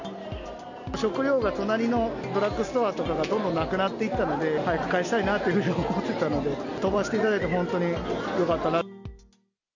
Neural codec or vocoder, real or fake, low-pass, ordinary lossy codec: none; real; 7.2 kHz; none